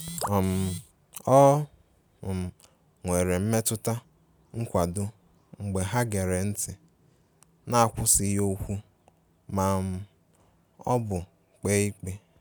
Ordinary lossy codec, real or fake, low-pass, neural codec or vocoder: none; real; none; none